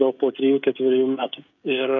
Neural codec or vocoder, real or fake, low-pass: codec, 16 kHz, 16 kbps, FreqCodec, smaller model; fake; 7.2 kHz